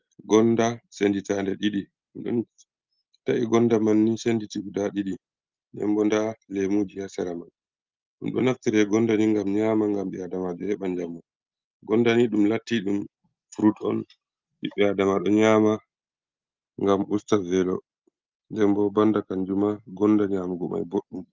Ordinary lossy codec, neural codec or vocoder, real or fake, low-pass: Opus, 24 kbps; none; real; 7.2 kHz